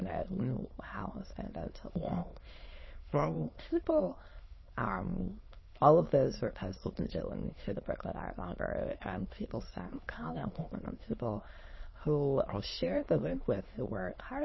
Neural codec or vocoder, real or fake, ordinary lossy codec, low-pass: autoencoder, 22.05 kHz, a latent of 192 numbers a frame, VITS, trained on many speakers; fake; MP3, 24 kbps; 7.2 kHz